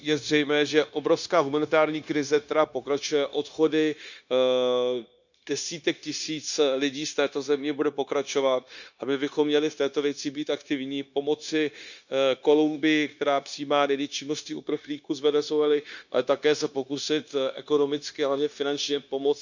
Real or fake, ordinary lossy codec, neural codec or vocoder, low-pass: fake; none; codec, 16 kHz, 0.9 kbps, LongCat-Audio-Codec; 7.2 kHz